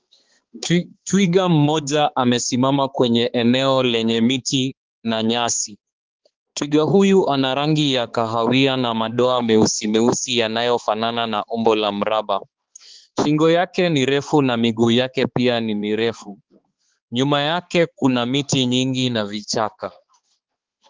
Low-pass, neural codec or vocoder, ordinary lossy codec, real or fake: 7.2 kHz; codec, 16 kHz, 4 kbps, X-Codec, HuBERT features, trained on balanced general audio; Opus, 16 kbps; fake